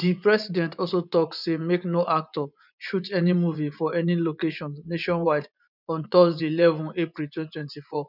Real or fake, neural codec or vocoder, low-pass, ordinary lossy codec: fake; vocoder, 24 kHz, 100 mel bands, Vocos; 5.4 kHz; none